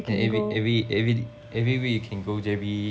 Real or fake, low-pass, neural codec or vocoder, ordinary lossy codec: real; none; none; none